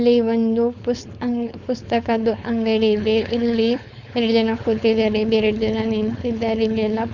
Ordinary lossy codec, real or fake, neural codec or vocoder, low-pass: none; fake; codec, 16 kHz, 4.8 kbps, FACodec; 7.2 kHz